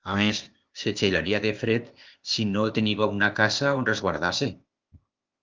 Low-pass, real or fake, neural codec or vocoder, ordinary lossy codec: 7.2 kHz; fake; codec, 16 kHz, 0.8 kbps, ZipCodec; Opus, 24 kbps